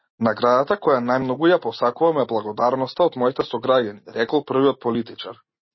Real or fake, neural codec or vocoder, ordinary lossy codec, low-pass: real; none; MP3, 24 kbps; 7.2 kHz